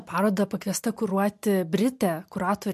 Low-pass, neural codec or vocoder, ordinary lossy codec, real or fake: 14.4 kHz; none; MP3, 64 kbps; real